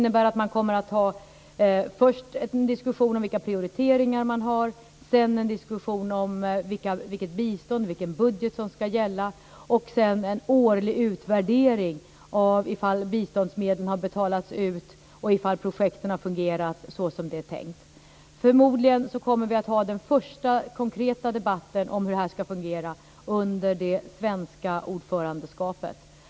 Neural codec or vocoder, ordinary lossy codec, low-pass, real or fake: none; none; none; real